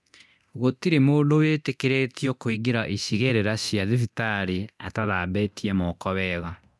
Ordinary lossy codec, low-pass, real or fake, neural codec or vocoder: none; none; fake; codec, 24 kHz, 0.9 kbps, DualCodec